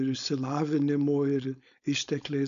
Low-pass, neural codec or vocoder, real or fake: 7.2 kHz; codec, 16 kHz, 4.8 kbps, FACodec; fake